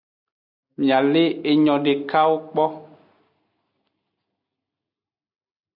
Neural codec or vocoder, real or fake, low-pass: none; real; 5.4 kHz